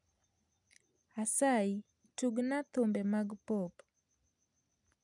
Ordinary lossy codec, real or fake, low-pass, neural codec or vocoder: none; real; 10.8 kHz; none